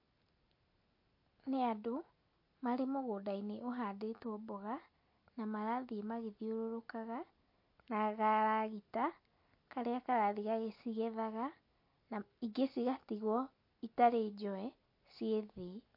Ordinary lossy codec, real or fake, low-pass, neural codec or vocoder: MP3, 32 kbps; real; 5.4 kHz; none